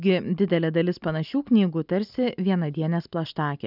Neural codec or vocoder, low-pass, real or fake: none; 5.4 kHz; real